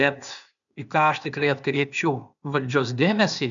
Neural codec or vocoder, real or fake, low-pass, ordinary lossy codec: codec, 16 kHz, 0.8 kbps, ZipCodec; fake; 7.2 kHz; MP3, 96 kbps